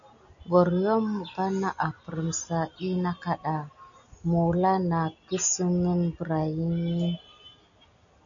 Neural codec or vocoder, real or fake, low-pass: none; real; 7.2 kHz